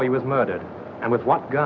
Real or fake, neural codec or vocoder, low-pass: real; none; 7.2 kHz